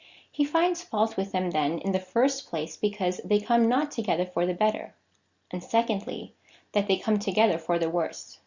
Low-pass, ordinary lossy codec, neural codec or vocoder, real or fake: 7.2 kHz; Opus, 64 kbps; none; real